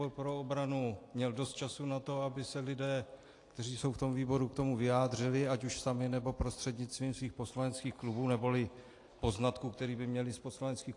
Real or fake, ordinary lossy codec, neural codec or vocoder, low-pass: real; AAC, 48 kbps; none; 10.8 kHz